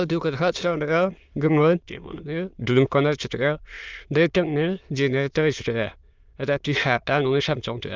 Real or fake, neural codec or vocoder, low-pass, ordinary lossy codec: fake; autoencoder, 22.05 kHz, a latent of 192 numbers a frame, VITS, trained on many speakers; 7.2 kHz; Opus, 24 kbps